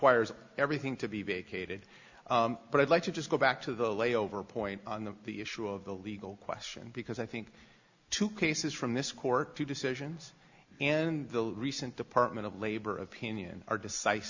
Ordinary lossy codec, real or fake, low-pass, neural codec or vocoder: Opus, 64 kbps; real; 7.2 kHz; none